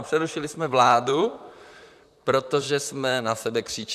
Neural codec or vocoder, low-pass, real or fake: vocoder, 44.1 kHz, 128 mel bands, Pupu-Vocoder; 14.4 kHz; fake